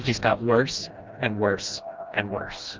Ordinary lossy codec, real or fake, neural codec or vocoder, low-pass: Opus, 32 kbps; fake; codec, 16 kHz, 1 kbps, FreqCodec, smaller model; 7.2 kHz